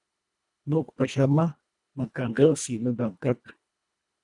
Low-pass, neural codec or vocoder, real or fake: 10.8 kHz; codec, 24 kHz, 1.5 kbps, HILCodec; fake